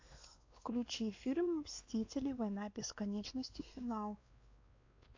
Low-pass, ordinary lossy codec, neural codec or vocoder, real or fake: 7.2 kHz; AAC, 48 kbps; codec, 16 kHz, 2 kbps, X-Codec, WavLM features, trained on Multilingual LibriSpeech; fake